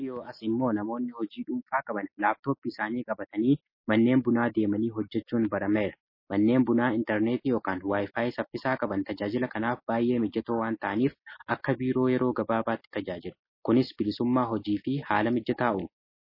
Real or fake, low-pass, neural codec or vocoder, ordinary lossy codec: real; 5.4 kHz; none; MP3, 24 kbps